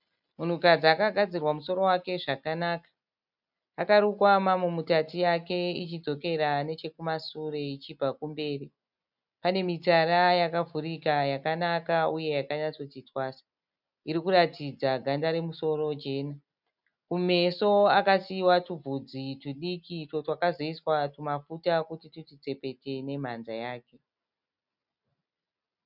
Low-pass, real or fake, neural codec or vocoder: 5.4 kHz; real; none